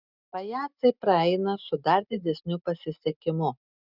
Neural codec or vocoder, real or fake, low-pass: none; real; 5.4 kHz